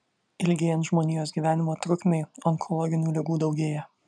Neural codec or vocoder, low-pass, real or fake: none; 9.9 kHz; real